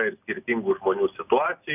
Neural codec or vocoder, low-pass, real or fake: none; 3.6 kHz; real